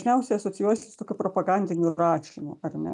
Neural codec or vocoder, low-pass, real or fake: none; 10.8 kHz; real